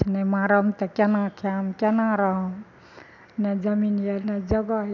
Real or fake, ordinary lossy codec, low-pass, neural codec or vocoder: real; none; 7.2 kHz; none